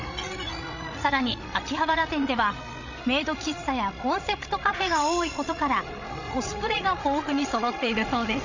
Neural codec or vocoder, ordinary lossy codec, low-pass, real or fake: codec, 16 kHz, 16 kbps, FreqCodec, larger model; none; 7.2 kHz; fake